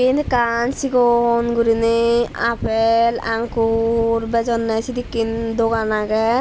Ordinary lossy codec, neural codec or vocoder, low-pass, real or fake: none; none; none; real